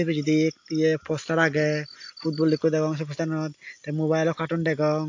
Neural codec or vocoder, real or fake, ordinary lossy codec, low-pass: none; real; AAC, 48 kbps; 7.2 kHz